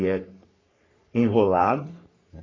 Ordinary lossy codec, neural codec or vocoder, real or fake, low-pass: AAC, 32 kbps; codec, 44.1 kHz, 3.4 kbps, Pupu-Codec; fake; 7.2 kHz